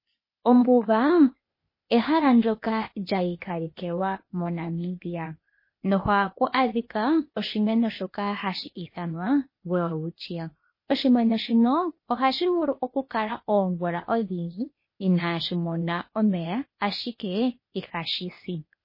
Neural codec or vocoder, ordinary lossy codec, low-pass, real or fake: codec, 16 kHz, 0.8 kbps, ZipCodec; MP3, 24 kbps; 5.4 kHz; fake